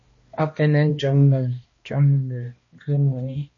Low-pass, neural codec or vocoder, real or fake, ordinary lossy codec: 7.2 kHz; codec, 16 kHz, 1 kbps, X-Codec, HuBERT features, trained on balanced general audio; fake; MP3, 32 kbps